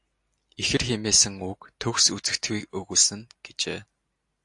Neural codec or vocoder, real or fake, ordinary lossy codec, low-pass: none; real; AAC, 64 kbps; 10.8 kHz